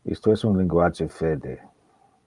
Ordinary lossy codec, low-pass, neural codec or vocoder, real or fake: Opus, 32 kbps; 10.8 kHz; vocoder, 44.1 kHz, 128 mel bands every 512 samples, BigVGAN v2; fake